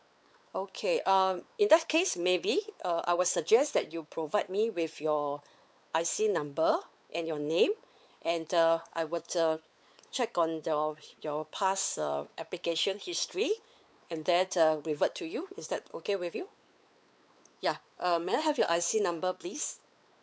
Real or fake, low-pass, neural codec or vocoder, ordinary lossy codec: fake; none; codec, 16 kHz, 4 kbps, X-Codec, WavLM features, trained on Multilingual LibriSpeech; none